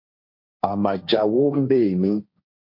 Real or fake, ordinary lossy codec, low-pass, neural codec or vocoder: fake; MP3, 32 kbps; 5.4 kHz; codec, 16 kHz, 1.1 kbps, Voila-Tokenizer